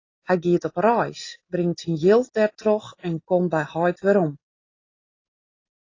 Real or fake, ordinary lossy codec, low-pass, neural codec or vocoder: real; AAC, 32 kbps; 7.2 kHz; none